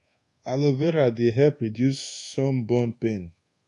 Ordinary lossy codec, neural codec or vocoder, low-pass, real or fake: AAC, 48 kbps; codec, 24 kHz, 1.2 kbps, DualCodec; 10.8 kHz; fake